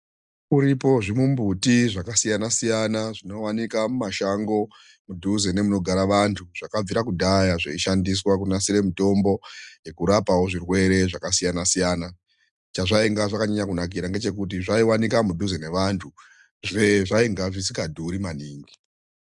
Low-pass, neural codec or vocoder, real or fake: 10.8 kHz; none; real